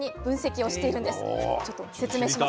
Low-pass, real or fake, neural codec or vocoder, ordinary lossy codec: none; real; none; none